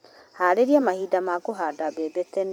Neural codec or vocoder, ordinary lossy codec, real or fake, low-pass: vocoder, 44.1 kHz, 128 mel bands every 512 samples, BigVGAN v2; none; fake; none